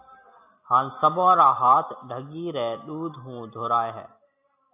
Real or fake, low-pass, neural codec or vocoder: real; 3.6 kHz; none